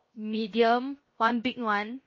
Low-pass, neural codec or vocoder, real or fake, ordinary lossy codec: 7.2 kHz; codec, 16 kHz, 0.7 kbps, FocalCodec; fake; MP3, 32 kbps